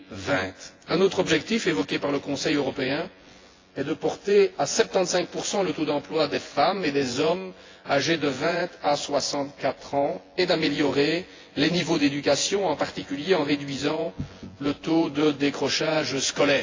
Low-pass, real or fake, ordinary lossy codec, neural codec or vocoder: 7.2 kHz; fake; AAC, 32 kbps; vocoder, 24 kHz, 100 mel bands, Vocos